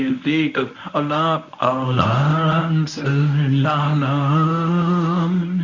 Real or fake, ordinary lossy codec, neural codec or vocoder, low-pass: fake; none; codec, 24 kHz, 0.9 kbps, WavTokenizer, medium speech release version 1; 7.2 kHz